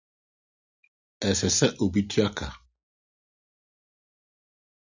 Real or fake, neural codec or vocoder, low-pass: real; none; 7.2 kHz